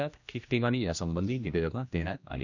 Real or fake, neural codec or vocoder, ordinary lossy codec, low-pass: fake; codec, 16 kHz, 1 kbps, X-Codec, HuBERT features, trained on general audio; none; 7.2 kHz